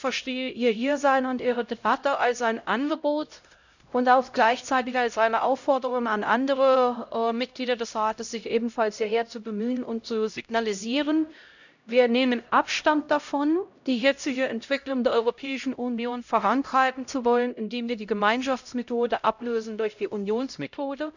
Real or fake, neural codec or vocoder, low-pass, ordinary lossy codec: fake; codec, 16 kHz, 0.5 kbps, X-Codec, HuBERT features, trained on LibriSpeech; 7.2 kHz; none